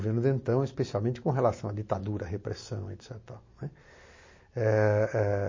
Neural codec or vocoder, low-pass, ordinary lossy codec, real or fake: none; 7.2 kHz; MP3, 32 kbps; real